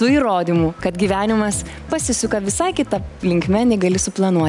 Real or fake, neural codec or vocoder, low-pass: real; none; 10.8 kHz